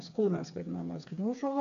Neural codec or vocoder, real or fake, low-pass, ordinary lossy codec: codec, 16 kHz, 4 kbps, FreqCodec, smaller model; fake; 7.2 kHz; AAC, 48 kbps